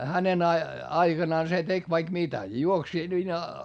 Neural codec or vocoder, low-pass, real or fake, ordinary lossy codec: none; 9.9 kHz; real; none